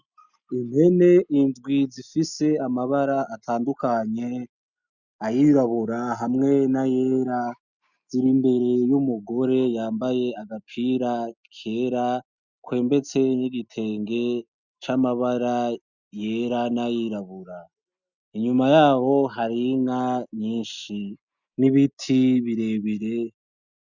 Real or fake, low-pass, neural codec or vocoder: real; 7.2 kHz; none